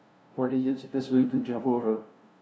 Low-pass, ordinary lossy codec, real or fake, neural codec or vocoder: none; none; fake; codec, 16 kHz, 0.5 kbps, FunCodec, trained on LibriTTS, 25 frames a second